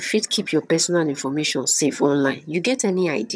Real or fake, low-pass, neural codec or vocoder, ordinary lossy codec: fake; none; vocoder, 22.05 kHz, 80 mel bands, HiFi-GAN; none